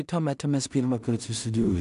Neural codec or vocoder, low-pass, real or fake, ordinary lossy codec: codec, 16 kHz in and 24 kHz out, 0.4 kbps, LongCat-Audio-Codec, two codebook decoder; 10.8 kHz; fake; MP3, 96 kbps